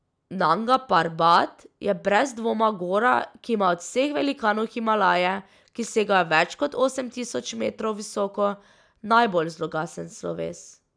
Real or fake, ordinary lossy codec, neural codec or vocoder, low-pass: real; none; none; 9.9 kHz